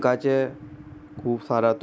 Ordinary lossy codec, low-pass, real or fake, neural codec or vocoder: none; none; real; none